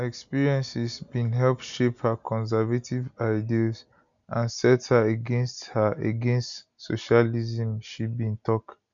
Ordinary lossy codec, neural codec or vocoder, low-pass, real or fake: none; none; 7.2 kHz; real